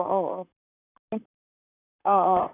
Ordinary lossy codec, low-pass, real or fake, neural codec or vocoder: none; 3.6 kHz; fake; vocoder, 44.1 kHz, 128 mel bands, Pupu-Vocoder